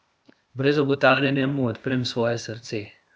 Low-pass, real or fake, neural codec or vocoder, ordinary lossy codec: none; fake; codec, 16 kHz, 0.8 kbps, ZipCodec; none